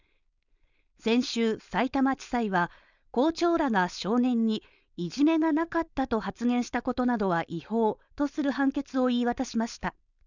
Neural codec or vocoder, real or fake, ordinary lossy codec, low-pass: codec, 16 kHz, 4.8 kbps, FACodec; fake; none; 7.2 kHz